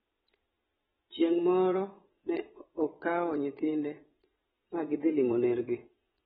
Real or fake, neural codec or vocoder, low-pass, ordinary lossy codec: fake; vocoder, 22.05 kHz, 80 mel bands, WaveNeXt; 9.9 kHz; AAC, 16 kbps